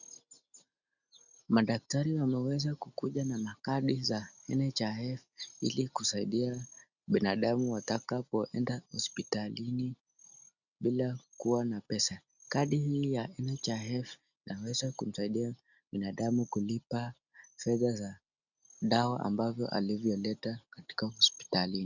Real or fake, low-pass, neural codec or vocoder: real; 7.2 kHz; none